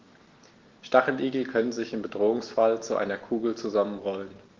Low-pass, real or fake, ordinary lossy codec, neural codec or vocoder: 7.2 kHz; real; Opus, 16 kbps; none